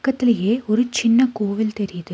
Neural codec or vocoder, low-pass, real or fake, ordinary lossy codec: none; none; real; none